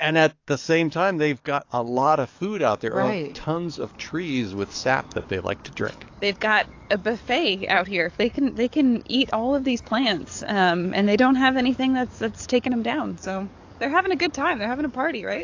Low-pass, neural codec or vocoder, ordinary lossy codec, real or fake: 7.2 kHz; codec, 44.1 kHz, 7.8 kbps, DAC; AAC, 48 kbps; fake